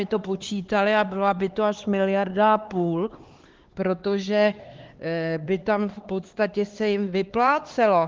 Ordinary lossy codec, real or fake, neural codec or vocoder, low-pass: Opus, 16 kbps; fake; codec, 16 kHz, 4 kbps, X-Codec, HuBERT features, trained on LibriSpeech; 7.2 kHz